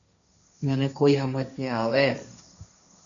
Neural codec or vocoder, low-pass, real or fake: codec, 16 kHz, 1.1 kbps, Voila-Tokenizer; 7.2 kHz; fake